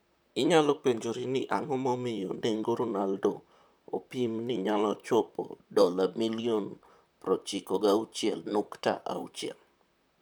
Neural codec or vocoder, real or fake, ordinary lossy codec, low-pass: vocoder, 44.1 kHz, 128 mel bands, Pupu-Vocoder; fake; none; none